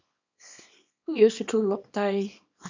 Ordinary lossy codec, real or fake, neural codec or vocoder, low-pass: MP3, 64 kbps; fake; codec, 24 kHz, 0.9 kbps, WavTokenizer, small release; 7.2 kHz